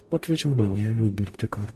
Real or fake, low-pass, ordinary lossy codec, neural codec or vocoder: fake; 14.4 kHz; MP3, 64 kbps; codec, 44.1 kHz, 0.9 kbps, DAC